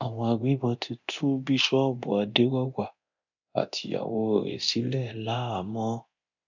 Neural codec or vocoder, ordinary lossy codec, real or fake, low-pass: codec, 24 kHz, 0.9 kbps, DualCodec; none; fake; 7.2 kHz